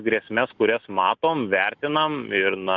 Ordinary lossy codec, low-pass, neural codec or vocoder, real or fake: Opus, 64 kbps; 7.2 kHz; none; real